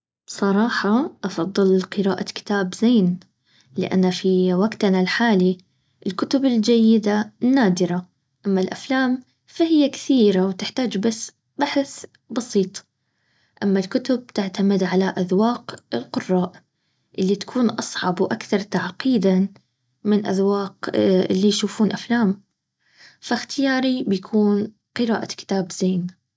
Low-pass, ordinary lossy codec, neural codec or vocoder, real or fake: none; none; none; real